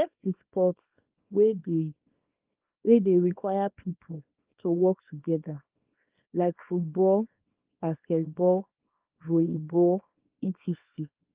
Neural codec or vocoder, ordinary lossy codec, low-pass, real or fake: codec, 24 kHz, 0.9 kbps, WavTokenizer, small release; Opus, 32 kbps; 3.6 kHz; fake